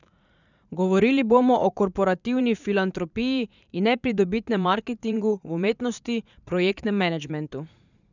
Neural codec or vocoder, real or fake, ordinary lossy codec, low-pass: vocoder, 44.1 kHz, 80 mel bands, Vocos; fake; none; 7.2 kHz